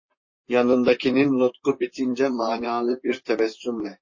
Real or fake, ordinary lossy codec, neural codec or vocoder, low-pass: fake; MP3, 32 kbps; vocoder, 44.1 kHz, 128 mel bands, Pupu-Vocoder; 7.2 kHz